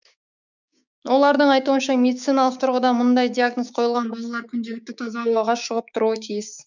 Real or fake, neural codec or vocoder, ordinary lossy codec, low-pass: fake; codec, 16 kHz, 6 kbps, DAC; none; 7.2 kHz